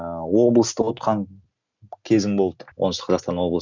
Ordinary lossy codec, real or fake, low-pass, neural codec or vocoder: none; real; 7.2 kHz; none